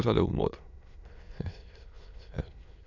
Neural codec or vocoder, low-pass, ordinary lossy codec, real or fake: autoencoder, 22.05 kHz, a latent of 192 numbers a frame, VITS, trained on many speakers; 7.2 kHz; none; fake